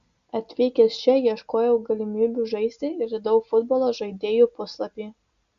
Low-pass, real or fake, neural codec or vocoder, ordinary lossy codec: 7.2 kHz; real; none; Opus, 64 kbps